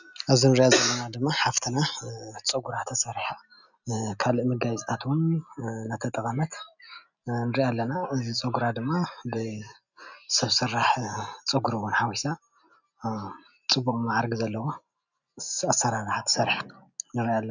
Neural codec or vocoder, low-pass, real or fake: none; 7.2 kHz; real